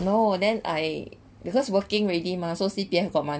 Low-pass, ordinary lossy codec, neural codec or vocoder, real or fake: none; none; none; real